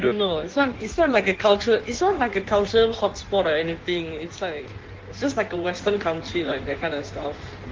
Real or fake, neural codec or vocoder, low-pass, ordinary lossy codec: fake; codec, 16 kHz in and 24 kHz out, 1.1 kbps, FireRedTTS-2 codec; 7.2 kHz; Opus, 16 kbps